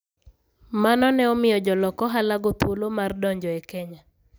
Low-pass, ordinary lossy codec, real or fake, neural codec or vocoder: none; none; real; none